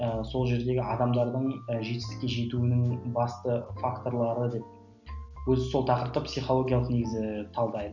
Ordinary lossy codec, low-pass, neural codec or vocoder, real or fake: none; 7.2 kHz; none; real